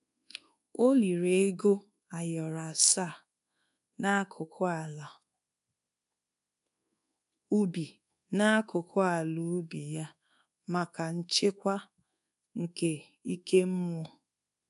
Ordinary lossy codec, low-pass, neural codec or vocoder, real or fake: none; 10.8 kHz; codec, 24 kHz, 1.2 kbps, DualCodec; fake